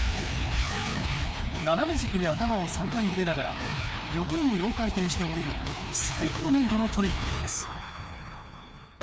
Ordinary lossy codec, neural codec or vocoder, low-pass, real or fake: none; codec, 16 kHz, 2 kbps, FreqCodec, larger model; none; fake